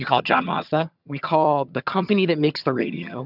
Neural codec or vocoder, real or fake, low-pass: vocoder, 22.05 kHz, 80 mel bands, HiFi-GAN; fake; 5.4 kHz